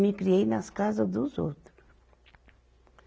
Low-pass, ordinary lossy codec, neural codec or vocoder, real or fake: none; none; none; real